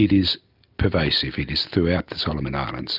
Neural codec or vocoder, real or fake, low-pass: none; real; 5.4 kHz